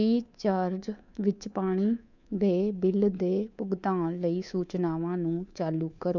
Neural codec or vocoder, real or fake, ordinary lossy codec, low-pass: codec, 24 kHz, 3.1 kbps, DualCodec; fake; none; 7.2 kHz